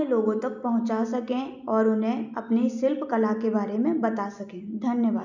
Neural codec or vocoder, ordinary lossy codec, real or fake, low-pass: none; none; real; 7.2 kHz